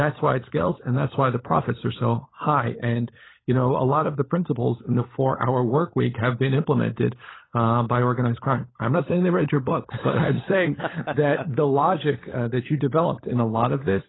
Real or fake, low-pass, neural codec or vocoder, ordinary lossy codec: fake; 7.2 kHz; codec, 16 kHz, 16 kbps, FunCodec, trained on LibriTTS, 50 frames a second; AAC, 16 kbps